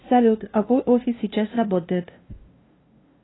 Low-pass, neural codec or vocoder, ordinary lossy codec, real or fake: 7.2 kHz; codec, 16 kHz, 0.5 kbps, FunCodec, trained on LibriTTS, 25 frames a second; AAC, 16 kbps; fake